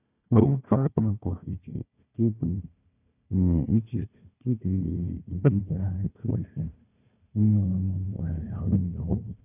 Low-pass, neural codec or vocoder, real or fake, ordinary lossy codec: 3.6 kHz; codec, 16 kHz, 1 kbps, FunCodec, trained on Chinese and English, 50 frames a second; fake; none